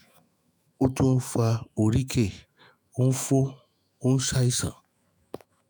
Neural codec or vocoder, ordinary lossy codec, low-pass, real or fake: autoencoder, 48 kHz, 128 numbers a frame, DAC-VAE, trained on Japanese speech; none; none; fake